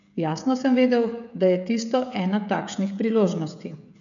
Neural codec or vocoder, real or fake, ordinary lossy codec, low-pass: codec, 16 kHz, 16 kbps, FreqCodec, smaller model; fake; none; 7.2 kHz